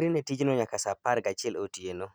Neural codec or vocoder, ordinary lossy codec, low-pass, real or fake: none; none; none; real